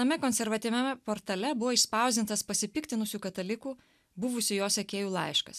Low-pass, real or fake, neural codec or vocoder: 14.4 kHz; real; none